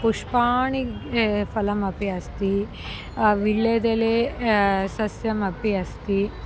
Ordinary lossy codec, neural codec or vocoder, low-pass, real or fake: none; none; none; real